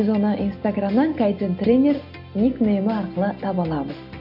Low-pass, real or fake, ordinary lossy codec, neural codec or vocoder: 5.4 kHz; real; none; none